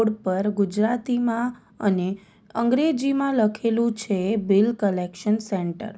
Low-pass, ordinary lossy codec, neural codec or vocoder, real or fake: none; none; none; real